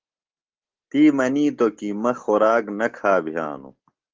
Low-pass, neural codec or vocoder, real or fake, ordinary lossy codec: 7.2 kHz; none; real; Opus, 16 kbps